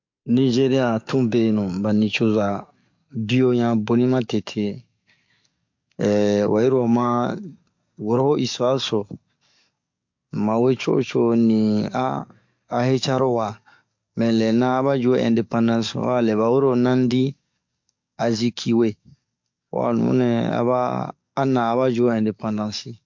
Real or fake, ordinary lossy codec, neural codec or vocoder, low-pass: fake; MP3, 48 kbps; codec, 44.1 kHz, 7.8 kbps, DAC; 7.2 kHz